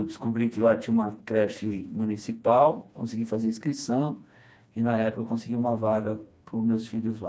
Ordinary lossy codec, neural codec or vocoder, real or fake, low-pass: none; codec, 16 kHz, 2 kbps, FreqCodec, smaller model; fake; none